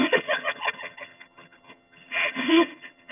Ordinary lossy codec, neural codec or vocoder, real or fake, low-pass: none; vocoder, 22.05 kHz, 80 mel bands, HiFi-GAN; fake; 3.6 kHz